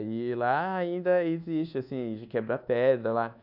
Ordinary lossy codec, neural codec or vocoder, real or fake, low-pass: none; codec, 24 kHz, 1.2 kbps, DualCodec; fake; 5.4 kHz